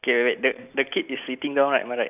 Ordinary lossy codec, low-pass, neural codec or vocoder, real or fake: none; 3.6 kHz; none; real